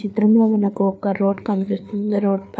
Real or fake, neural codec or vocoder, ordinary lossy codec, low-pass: fake; codec, 16 kHz, 4 kbps, FreqCodec, larger model; none; none